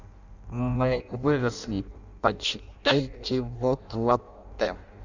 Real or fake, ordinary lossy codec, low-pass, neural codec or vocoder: fake; none; 7.2 kHz; codec, 16 kHz in and 24 kHz out, 0.6 kbps, FireRedTTS-2 codec